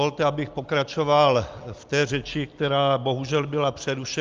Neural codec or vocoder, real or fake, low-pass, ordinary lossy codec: none; real; 7.2 kHz; Opus, 24 kbps